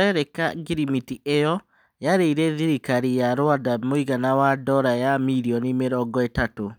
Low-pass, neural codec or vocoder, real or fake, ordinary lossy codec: none; none; real; none